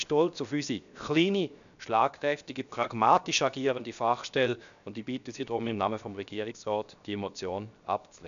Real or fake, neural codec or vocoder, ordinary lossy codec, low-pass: fake; codec, 16 kHz, 0.7 kbps, FocalCodec; none; 7.2 kHz